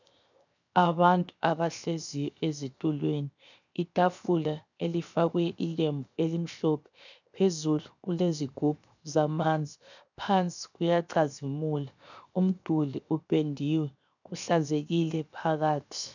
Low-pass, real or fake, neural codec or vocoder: 7.2 kHz; fake; codec, 16 kHz, 0.7 kbps, FocalCodec